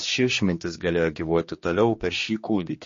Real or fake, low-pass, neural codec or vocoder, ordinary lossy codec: fake; 7.2 kHz; codec, 16 kHz, 2 kbps, X-Codec, HuBERT features, trained on general audio; MP3, 32 kbps